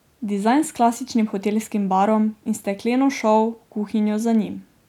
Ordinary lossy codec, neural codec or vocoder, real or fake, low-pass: none; none; real; 19.8 kHz